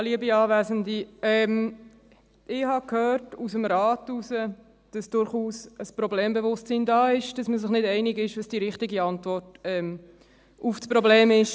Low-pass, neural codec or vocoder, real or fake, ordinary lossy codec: none; none; real; none